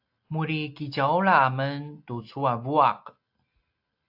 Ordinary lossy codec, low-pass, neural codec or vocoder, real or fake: AAC, 48 kbps; 5.4 kHz; none; real